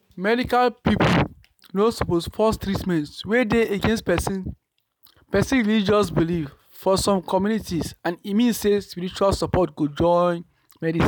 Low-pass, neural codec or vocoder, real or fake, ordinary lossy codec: none; none; real; none